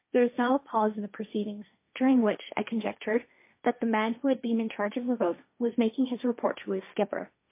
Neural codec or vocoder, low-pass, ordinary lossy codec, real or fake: codec, 16 kHz, 1.1 kbps, Voila-Tokenizer; 3.6 kHz; MP3, 24 kbps; fake